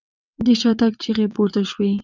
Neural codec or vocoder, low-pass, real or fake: vocoder, 22.05 kHz, 80 mel bands, WaveNeXt; 7.2 kHz; fake